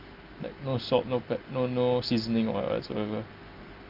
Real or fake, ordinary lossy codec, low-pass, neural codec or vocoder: real; Opus, 32 kbps; 5.4 kHz; none